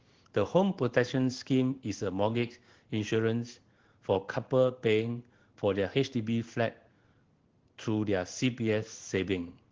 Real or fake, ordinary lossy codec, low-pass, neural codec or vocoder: fake; Opus, 16 kbps; 7.2 kHz; codec, 16 kHz in and 24 kHz out, 1 kbps, XY-Tokenizer